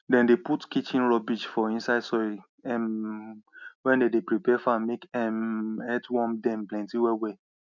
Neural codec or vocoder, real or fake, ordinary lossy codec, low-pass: none; real; none; 7.2 kHz